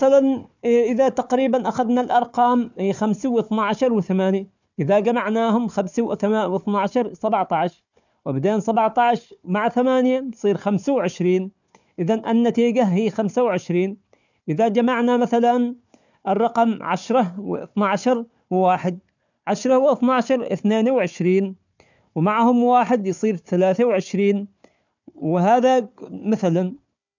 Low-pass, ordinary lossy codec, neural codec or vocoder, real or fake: 7.2 kHz; none; codec, 16 kHz, 4 kbps, FunCodec, trained on Chinese and English, 50 frames a second; fake